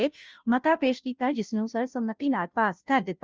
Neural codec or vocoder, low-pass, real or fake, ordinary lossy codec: codec, 16 kHz, 0.5 kbps, FunCodec, trained on Chinese and English, 25 frames a second; 7.2 kHz; fake; Opus, 24 kbps